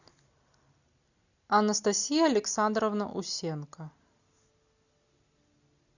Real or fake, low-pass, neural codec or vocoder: real; 7.2 kHz; none